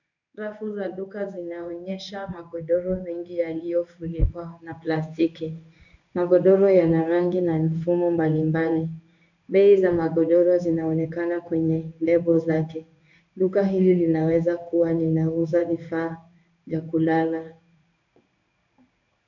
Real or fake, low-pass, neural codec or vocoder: fake; 7.2 kHz; codec, 16 kHz in and 24 kHz out, 1 kbps, XY-Tokenizer